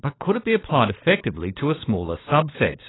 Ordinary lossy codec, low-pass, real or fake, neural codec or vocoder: AAC, 16 kbps; 7.2 kHz; fake; codec, 16 kHz, 16 kbps, FunCodec, trained on LibriTTS, 50 frames a second